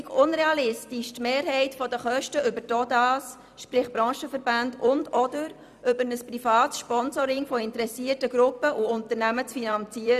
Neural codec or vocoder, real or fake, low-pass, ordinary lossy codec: vocoder, 44.1 kHz, 128 mel bands every 256 samples, BigVGAN v2; fake; 14.4 kHz; none